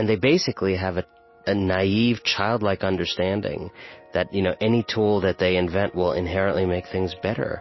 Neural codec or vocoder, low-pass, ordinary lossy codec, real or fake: none; 7.2 kHz; MP3, 24 kbps; real